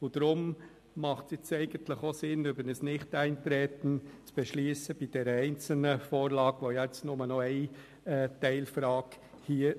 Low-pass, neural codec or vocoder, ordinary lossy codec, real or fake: 14.4 kHz; none; MP3, 64 kbps; real